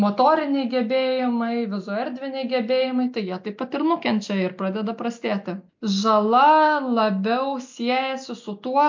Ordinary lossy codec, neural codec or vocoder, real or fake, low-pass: MP3, 64 kbps; none; real; 7.2 kHz